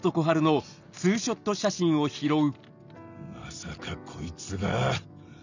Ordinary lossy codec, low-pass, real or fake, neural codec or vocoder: none; 7.2 kHz; real; none